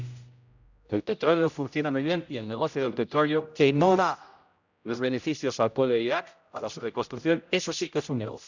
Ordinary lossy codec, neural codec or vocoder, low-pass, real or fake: none; codec, 16 kHz, 0.5 kbps, X-Codec, HuBERT features, trained on general audio; 7.2 kHz; fake